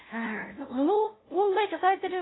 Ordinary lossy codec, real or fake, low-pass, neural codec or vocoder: AAC, 16 kbps; fake; 7.2 kHz; codec, 16 kHz, 0.5 kbps, FunCodec, trained on LibriTTS, 25 frames a second